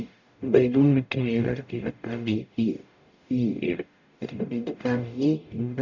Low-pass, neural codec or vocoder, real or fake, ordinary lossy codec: 7.2 kHz; codec, 44.1 kHz, 0.9 kbps, DAC; fake; none